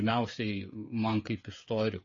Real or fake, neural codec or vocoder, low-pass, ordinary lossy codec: fake; codec, 16 kHz, 8 kbps, FreqCodec, smaller model; 7.2 kHz; MP3, 32 kbps